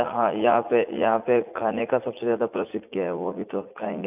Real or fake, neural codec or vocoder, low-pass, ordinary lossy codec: fake; vocoder, 44.1 kHz, 80 mel bands, Vocos; 3.6 kHz; none